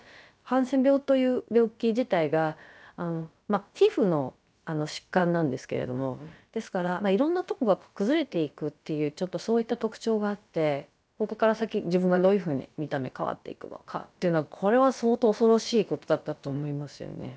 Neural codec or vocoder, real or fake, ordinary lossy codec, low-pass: codec, 16 kHz, about 1 kbps, DyCAST, with the encoder's durations; fake; none; none